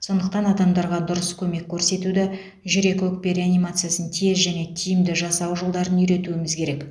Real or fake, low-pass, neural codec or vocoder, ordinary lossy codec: real; none; none; none